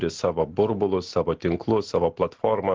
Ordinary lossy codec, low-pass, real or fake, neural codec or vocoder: Opus, 16 kbps; 7.2 kHz; real; none